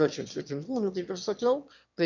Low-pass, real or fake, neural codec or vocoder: 7.2 kHz; fake; autoencoder, 22.05 kHz, a latent of 192 numbers a frame, VITS, trained on one speaker